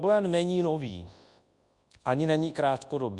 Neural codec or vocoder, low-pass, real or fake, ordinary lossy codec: codec, 24 kHz, 0.9 kbps, WavTokenizer, large speech release; 10.8 kHz; fake; Opus, 64 kbps